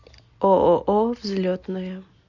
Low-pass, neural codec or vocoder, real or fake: 7.2 kHz; none; real